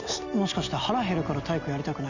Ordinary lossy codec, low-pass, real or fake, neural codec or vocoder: none; 7.2 kHz; real; none